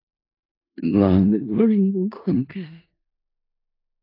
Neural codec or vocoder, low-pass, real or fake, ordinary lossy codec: codec, 16 kHz in and 24 kHz out, 0.4 kbps, LongCat-Audio-Codec, four codebook decoder; 5.4 kHz; fake; AAC, 32 kbps